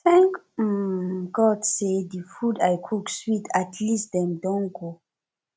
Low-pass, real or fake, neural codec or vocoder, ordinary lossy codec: none; real; none; none